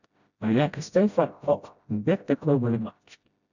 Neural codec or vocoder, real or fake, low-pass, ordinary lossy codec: codec, 16 kHz, 0.5 kbps, FreqCodec, smaller model; fake; 7.2 kHz; none